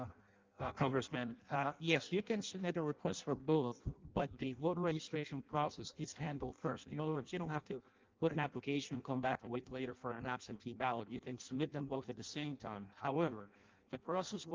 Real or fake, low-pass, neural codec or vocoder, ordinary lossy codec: fake; 7.2 kHz; codec, 16 kHz in and 24 kHz out, 0.6 kbps, FireRedTTS-2 codec; Opus, 32 kbps